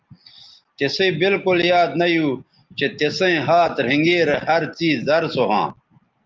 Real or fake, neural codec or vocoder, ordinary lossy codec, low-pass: real; none; Opus, 32 kbps; 7.2 kHz